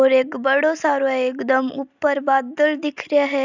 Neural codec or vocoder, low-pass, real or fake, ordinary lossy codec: none; 7.2 kHz; real; none